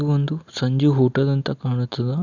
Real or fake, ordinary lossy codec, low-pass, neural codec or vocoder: real; none; 7.2 kHz; none